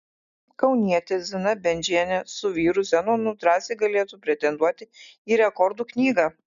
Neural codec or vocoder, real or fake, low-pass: none; real; 7.2 kHz